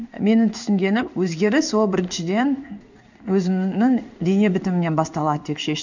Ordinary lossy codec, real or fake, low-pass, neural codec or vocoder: none; fake; 7.2 kHz; codec, 16 kHz in and 24 kHz out, 1 kbps, XY-Tokenizer